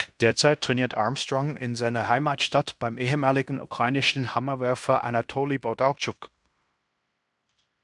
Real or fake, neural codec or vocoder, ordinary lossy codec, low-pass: fake; codec, 16 kHz in and 24 kHz out, 0.9 kbps, LongCat-Audio-Codec, fine tuned four codebook decoder; MP3, 96 kbps; 10.8 kHz